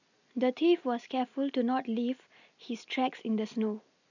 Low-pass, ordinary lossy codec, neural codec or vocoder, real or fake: 7.2 kHz; none; none; real